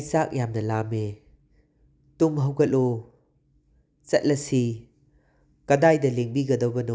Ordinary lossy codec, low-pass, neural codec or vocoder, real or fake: none; none; none; real